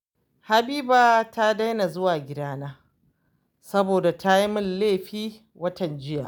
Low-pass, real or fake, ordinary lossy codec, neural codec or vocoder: none; real; none; none